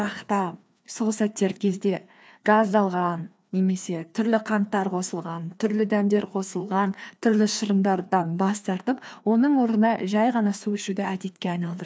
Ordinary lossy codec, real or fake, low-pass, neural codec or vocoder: none; fake; none; codec, 16 kHz, 2 kbps, FreqCodec, larger model